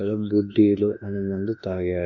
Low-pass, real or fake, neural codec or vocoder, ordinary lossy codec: 7.2 kHz; fake; autoencoder, 48 kHz, 32 numbers a frame, DAC-VAE, trained on Japanese speech; none